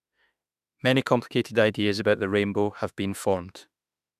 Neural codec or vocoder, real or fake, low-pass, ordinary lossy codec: autoencoder, 48 kHz, 32 numbers a frame, DAC-VAE, trained on Japanese speech; fake; 14.4 kHz; none